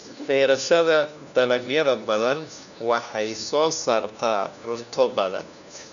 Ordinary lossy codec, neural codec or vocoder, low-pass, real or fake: none; codec, 16 kHz, 1 kbps, FunCodec, trained on LibriTTS, 50 frames a second; 7.2 kHz; fake